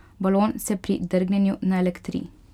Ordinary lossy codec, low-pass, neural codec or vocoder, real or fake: none; 19.8 kHz; none; real